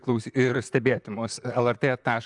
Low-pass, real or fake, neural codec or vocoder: 10.8 kHz; fake; vocoder, 44.1 kHz, 128 mel bands, Pupu-Vocoder